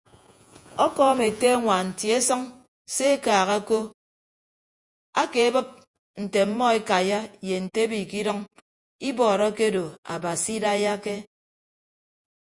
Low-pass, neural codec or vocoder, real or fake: 10.8 kHz; vocoder, 48 kHz, 128 mel bands, Vocos; fake